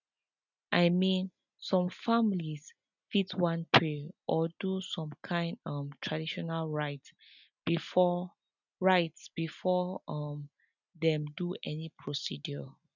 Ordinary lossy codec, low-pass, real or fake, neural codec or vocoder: none; 7.2 kHz; real; none